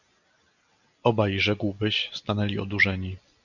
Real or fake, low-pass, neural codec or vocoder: real; 7.2 kHz; none